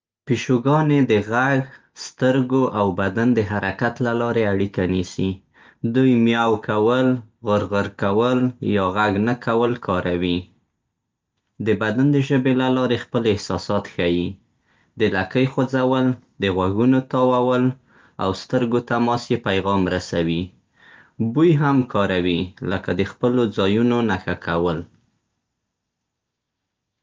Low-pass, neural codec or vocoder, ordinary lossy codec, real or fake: 7.2 kHz; none; Opus, 24 kbps; real